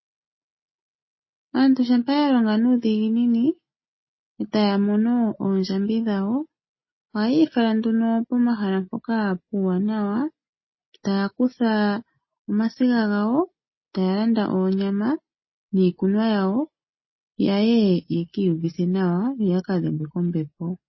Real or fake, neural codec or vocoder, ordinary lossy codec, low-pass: real; none; MP3, 24 kbps; 7.2 kHz